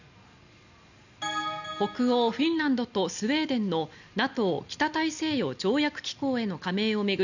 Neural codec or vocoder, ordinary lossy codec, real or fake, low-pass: none; none; real; 7.2 kHz